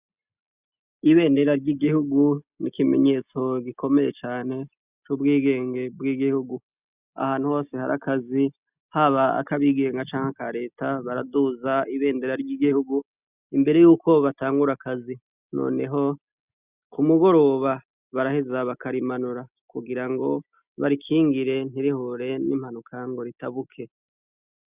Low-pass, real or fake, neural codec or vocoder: 3.6 kHz; real; none